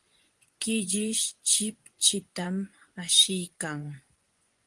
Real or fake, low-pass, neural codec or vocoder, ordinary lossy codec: fake; 10.8 kHz; vocoder, 24 kHz, 100 mel bands, Vocos; Opus, 24 kbps